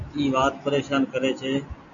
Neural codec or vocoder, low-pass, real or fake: none; 7.2 kHz; real